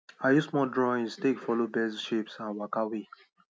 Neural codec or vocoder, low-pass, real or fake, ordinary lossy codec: none; none; real; none